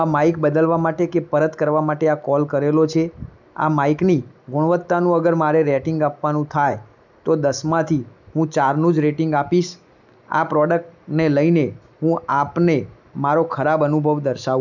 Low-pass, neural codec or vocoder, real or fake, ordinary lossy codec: 7.2 kHz; none; real; none